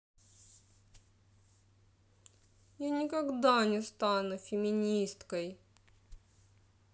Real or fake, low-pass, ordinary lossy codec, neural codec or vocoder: real; none; none; none